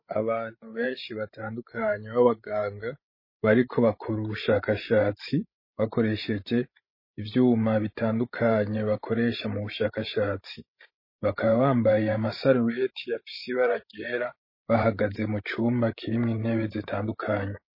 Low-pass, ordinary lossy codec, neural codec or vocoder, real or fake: 5.4 kHz; MP3, 24 kbps; codec, 16 kHz, 16 kbps, FreqCodec, larger model; fake